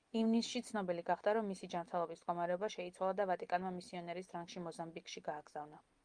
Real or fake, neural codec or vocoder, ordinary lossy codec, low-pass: real; none; Opus, 32 kbps; 9.9 kHz